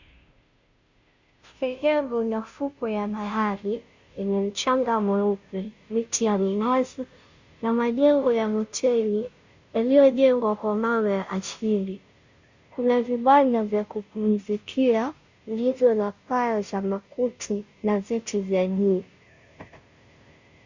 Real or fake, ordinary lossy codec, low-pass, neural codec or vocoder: fake; Opus, 64 kbps; 7.2 kHz; codec, 16 kHz, 0.5 kbps, FunCodec, trained on Chinese and English, 25 frames a second